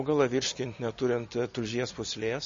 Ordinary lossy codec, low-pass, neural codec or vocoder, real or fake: MP3, 32 kbps; 7.2 kHz; none; real